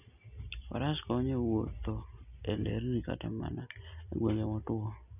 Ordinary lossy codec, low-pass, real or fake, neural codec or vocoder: none; 3.6 kHz; real; none